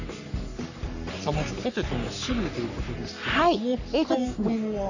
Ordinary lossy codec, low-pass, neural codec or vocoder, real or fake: none; 7.2 kHz; codec, 44.1 kHz, 3.4 kbps, Pupu-Codec; fake